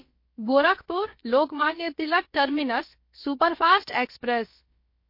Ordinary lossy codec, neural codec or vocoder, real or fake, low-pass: MP3, 32 kbps; codec, 16 kHz, about 1 kbps, DyCAST, with the encoder's durations; fake; 5.4 kHz